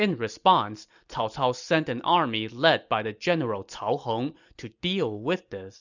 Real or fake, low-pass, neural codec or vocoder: real; 7.2 kHz; none